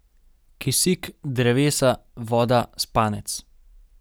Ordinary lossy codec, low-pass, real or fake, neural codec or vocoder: none; none; real; none